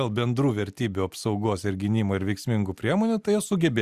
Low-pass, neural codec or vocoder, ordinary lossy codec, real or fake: 14.4 kHz; none; Opus, 64 kbps; real